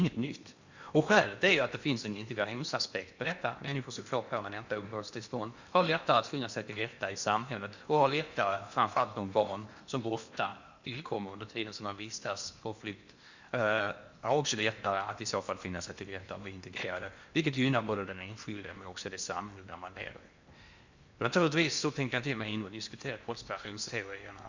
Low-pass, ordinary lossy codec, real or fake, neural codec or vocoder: 7.2 kHz; Opus, 64 kbps; fake; codec, 16 kHz in and 24 kHz out, 0.8 kbps, FocalCodec, streaming, 65536 codes